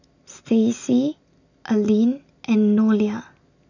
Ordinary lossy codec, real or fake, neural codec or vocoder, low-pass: none; real; none; 7.2 kHz